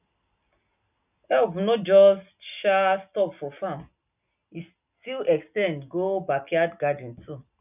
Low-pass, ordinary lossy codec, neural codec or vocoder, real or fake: 3.6 kHz; none; none; real